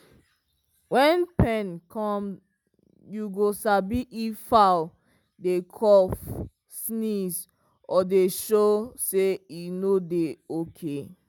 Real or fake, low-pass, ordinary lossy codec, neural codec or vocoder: real; 19.8 kHz; none; none